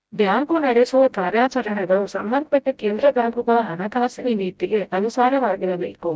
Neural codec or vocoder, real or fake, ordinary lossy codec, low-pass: codec, 16 kHz, 0.5 kbps, FreqCodec, smaller model; fake; none; none